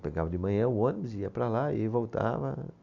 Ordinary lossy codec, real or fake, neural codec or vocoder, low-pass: none; real; none; 7.2 kHz